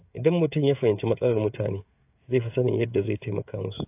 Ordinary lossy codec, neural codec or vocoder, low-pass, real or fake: AAC, 32 kbps; codec, 16 kHz, 16 kbps, FreqCodec, smaller model; 3.6 kHz; fake